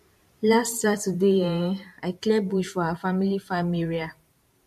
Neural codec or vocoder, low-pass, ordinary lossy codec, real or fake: vocoder, 44.1 kHz, 128 mel bands every 512 samples, BigVGAN v2; 14.4 kHz; MP3, 64 kbps; fake